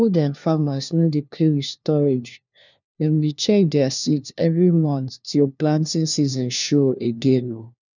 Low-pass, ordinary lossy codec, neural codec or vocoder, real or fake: 7.2 kHz; none; codec, 16 kHz, 1 kbps, FunCodec, trained on LibriTTS, 50 frames a second; fake